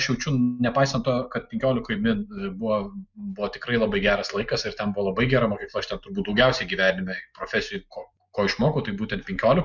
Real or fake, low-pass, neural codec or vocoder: real; 7.2 kHz; none